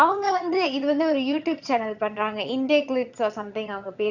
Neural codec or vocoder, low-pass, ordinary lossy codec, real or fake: vocoder, 22.05 kHz, 80 mel bands, HiFi-GAN; 7.2 kHz; none; fake